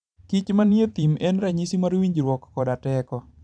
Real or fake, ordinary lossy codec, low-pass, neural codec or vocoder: fake; none; 9.9 kHz; vocoder, 44.1 kHz, 128 mel bands every 512 samples, BigVGAN v2